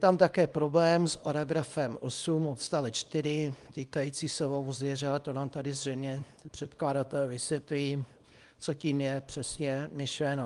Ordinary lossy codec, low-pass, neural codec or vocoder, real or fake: Opus, 32 kbps; 10.8 kHz; codec, 24 kHz, 0.9 kbps, WavTokenizer, small release; fake